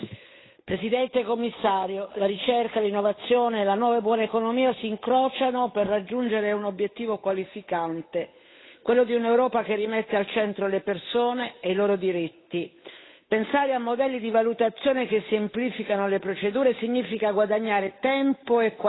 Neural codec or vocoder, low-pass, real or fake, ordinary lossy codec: codec, 16 kHz, 8 kbps, FunCodec, trained on Chinese and English, 25 frames a second; 7.2 kHz; fake; AAC, 16 kbps